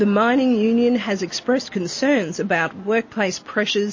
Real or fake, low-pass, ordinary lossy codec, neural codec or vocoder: real; 7.2 kHz; MP3, 32 kbps; none